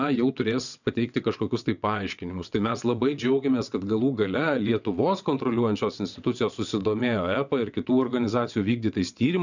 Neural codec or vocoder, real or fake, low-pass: vocoder, 22.05 kHz, 80 mel bands, WaveNeXt; fake; 7.2 kHz